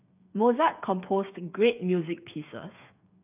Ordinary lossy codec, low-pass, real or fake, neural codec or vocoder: none; 3.6 kHz; fake; codec, 16 kHz, 8 kbps, FreqCodec, smaller model